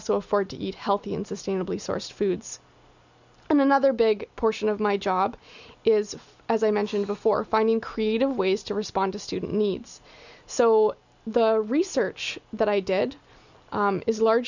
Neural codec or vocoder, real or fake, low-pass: none; real; 7.2 kHz